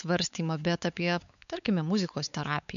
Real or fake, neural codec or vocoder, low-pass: fake; codec, 16 kHz, 4 kbps, X-Codec, WavLM features, trained on Multilingual LibriSpeech; 7.2 kHz